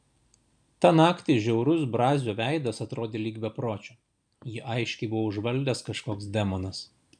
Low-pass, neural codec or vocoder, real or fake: 9.9 kHz; none; real